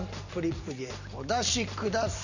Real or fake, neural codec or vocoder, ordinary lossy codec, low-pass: real; none; none; 7.2 kHz